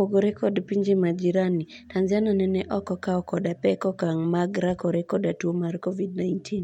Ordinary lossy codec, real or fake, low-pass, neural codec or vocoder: MP3, 64 kbps; real; 19.8 kHz; none